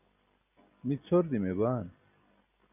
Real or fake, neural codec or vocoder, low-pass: real; none; 3.6 kHz